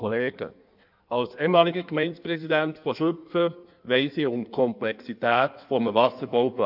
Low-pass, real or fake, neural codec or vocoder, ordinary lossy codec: 5.4 kHz; fake; codec, 16 kHz in and 24 kHz out, 1.1 kbps, FireRedTTS-2 codec; none